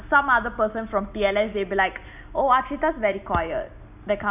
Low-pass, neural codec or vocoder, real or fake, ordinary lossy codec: 3.6 kHz; none; real; none